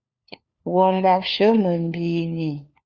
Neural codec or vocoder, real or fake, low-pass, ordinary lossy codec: codec, 16 kHz, 4 kbps, FunCodec, trained on LibriTTS, 50 frames a second; fake; 7.2 kHz; Opus, 64 kbps